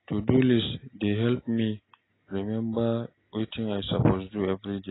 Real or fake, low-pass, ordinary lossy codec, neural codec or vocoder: real; 7.2 kHz; AAC, 16 kbps; none